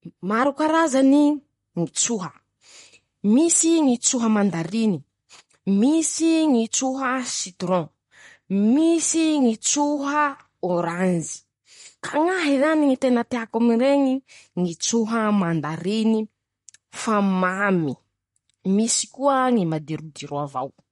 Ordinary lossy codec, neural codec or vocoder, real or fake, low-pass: MP3, 48 kbps; vocoder, 44.1 kHz, 128 mel bands, Pupu-Vocoder; fake; 19.8 kHz